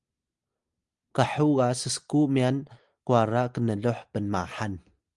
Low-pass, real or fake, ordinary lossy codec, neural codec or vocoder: 10.8 kHz; real; Opus, 32 kbps; none